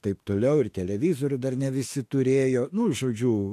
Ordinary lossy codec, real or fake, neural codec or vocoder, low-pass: AAC, 64 kbps; fake; autoencoder, 48 kHz, 32 numbers a frame, DAC-VAE, trained on Japanese speech; 14.4 kHz